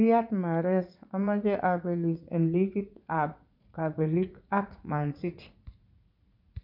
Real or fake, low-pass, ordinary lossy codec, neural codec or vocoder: fake; 5.4 kHz; none; vocoder, 44.1 kHz, 80 mel bands, Vocos